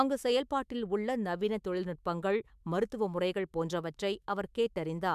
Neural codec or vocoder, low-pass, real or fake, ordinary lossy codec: autoencoder, 48 kHz, 128 numbers a frame, DAC-VAE, trained on Japanese speech; 14.4 kHz; fake; MP3, 96 kbps